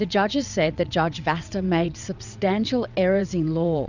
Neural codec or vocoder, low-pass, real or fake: vocoder, 22.05 kHz, 80 mel bands, WaveNeXt; 7.2 kHz; fake